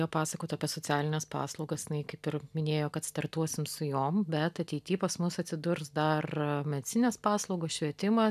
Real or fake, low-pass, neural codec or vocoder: real; 14.4 kHz; none